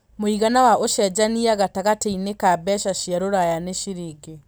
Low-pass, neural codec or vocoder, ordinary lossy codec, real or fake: none; none; none; real